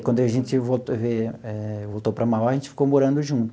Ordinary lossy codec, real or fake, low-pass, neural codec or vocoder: none; real; none; none